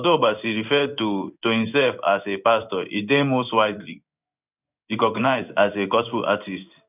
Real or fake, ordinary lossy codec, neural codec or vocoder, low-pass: fake; none; codec, 16 kHz in and 24 kHz out, 1 kbps, XY-Tokenizer; 3.6 kHz